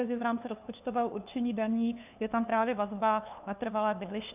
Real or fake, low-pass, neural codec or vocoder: fake; 3.6 kHz; codec, 16 kHz, 2 kbps, FunCodec, trained on LibriTTS, 25 frames a second